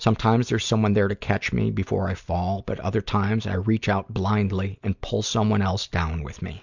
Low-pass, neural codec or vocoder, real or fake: 7.2 kHz; none; real